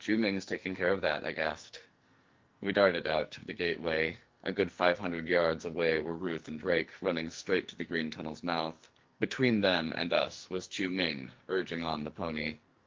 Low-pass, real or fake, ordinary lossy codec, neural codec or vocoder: 7.2 kHz; fake; Opus, 32 kbps; codec, 44.1 kHz, 2.6 kbps, SNAC